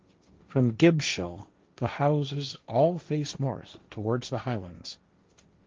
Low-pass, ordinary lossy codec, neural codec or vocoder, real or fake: 7.2 kHz; Opus, 24 kbps; codec, 16 kHz, 1.1 kbps, Voila-Tokenizer; fake